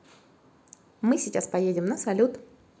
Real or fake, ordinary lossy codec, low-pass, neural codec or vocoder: real; none; none; none